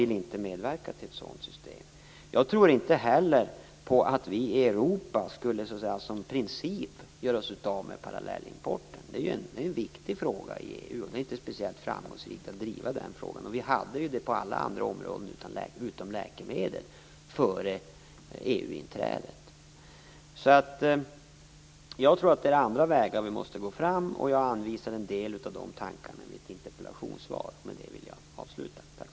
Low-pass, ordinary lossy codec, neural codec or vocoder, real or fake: none; none; none; real